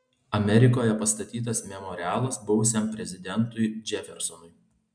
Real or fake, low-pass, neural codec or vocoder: real; 9.9 kHz; none